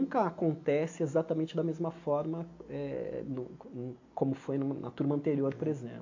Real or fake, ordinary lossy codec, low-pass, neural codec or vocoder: real; AAC, 48 kbps; 7.2 kHz; none